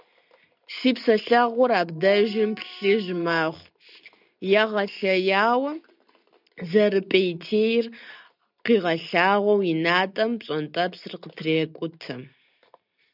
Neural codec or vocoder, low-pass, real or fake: none; 5.4 kHz; real